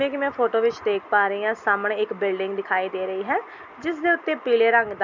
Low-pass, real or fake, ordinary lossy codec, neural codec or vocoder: 7.2 kHz; real; none; none